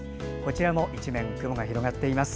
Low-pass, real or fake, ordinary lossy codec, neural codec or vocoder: none; real; none; none